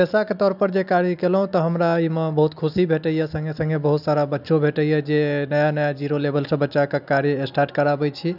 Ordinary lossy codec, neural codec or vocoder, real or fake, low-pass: none; none; real; 5.4 kHz